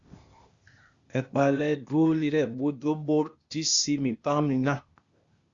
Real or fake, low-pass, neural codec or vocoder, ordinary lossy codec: fake; 7.2 kHz; codec, 16 kHz, 0.8 kbps, ZipCodec; Opus, 64 kbps